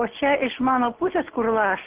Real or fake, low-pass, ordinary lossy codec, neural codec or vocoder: real; 3.6 kHz; Opus, 16 kbps; none